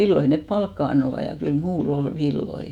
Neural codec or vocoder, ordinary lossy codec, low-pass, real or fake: autoencoder, 48 kHz, 128 numbers a frame, DAC-VAE, trained on Japanese speech; none; 19.8 kHz; fake